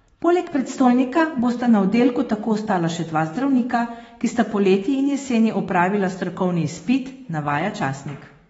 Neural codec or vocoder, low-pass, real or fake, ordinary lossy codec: autoencoder, 48 kHz, 128 numbers a frame, DAC-VAE, trained on Japanese speech; 19.8 kHz; fake; AAC, 24 kbps